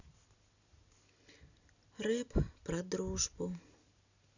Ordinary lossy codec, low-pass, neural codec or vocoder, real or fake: none; 7.2 kHz; none; real